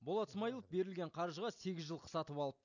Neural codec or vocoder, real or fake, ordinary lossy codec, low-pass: none; real; none; 7.2 kHz